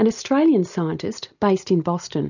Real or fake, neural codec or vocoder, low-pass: real; none; 7.2 kHz